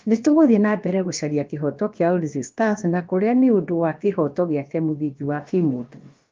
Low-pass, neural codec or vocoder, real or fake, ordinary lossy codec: 7.2 kHz; codec, 16 kHz, about 1 kbps, DyCAST, with the encoder's durations; fake; Opus, 32 kbps